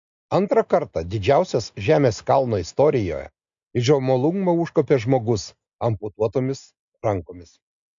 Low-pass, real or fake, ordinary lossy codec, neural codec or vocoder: 7.2 kHz; real; MP3, 64 kbps; none